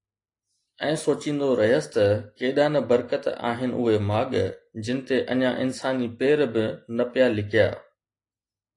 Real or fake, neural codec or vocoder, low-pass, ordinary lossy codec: real; none; 10.8 kHz; AAC, 48 kbps